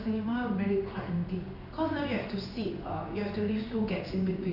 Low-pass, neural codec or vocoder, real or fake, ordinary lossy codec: 5.4 kHz; codec, 16 kHz in and 24 kHz out, 1 kbps, XY-Tokenizer; fake; none